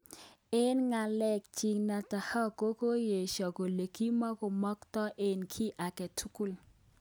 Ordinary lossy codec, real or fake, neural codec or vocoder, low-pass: none; real; none; none